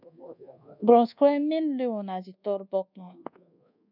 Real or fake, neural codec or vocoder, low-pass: fake; codec, 24 kHz, 1.2 kbps, DualCodec; 5.4 kHz